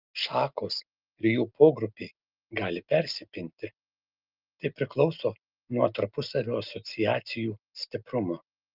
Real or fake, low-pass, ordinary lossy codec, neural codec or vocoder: real; 5.4 kHz; Opus, 32 kbps; none